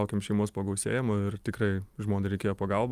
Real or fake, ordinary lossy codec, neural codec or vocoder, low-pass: real; Opus, 32 kbps; none; 14.4 kHz